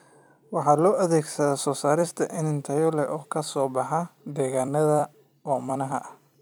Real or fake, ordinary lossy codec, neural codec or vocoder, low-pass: fake; none; vocoder, 44.1 kHz, 128 mel bands every 256 samples, BigVGAN v2; none